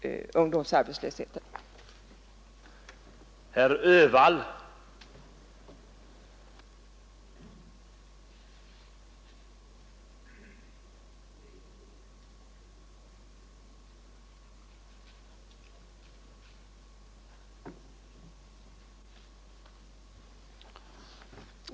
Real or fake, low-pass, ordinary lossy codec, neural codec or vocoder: real; none; none; none